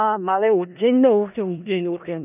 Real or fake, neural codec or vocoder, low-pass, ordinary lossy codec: fake; codec, 16 kHz in and 24 kHz out, 0.4 kbps, LongCat-Audio-Codec, four codebook decoder; 3.6 kHz; none